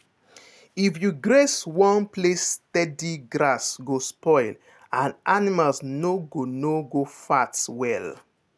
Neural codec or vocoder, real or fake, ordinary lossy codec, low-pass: none; real; none; none